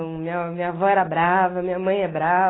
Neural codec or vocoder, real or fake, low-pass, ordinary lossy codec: none; real; 7.2 kHz; AAC, 16 kbps